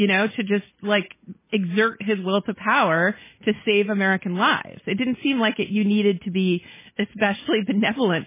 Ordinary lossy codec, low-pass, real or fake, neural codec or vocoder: MP3, 16 kbps; 3.6 kHz; real; none